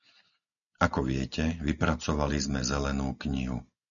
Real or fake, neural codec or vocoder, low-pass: real; none; 7.2 kHz